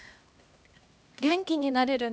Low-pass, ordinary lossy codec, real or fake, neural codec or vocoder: none; none; fake; codec, 16 kHz, 1 kbps, X-Codec, HuBERT features, trained on LibriSpeech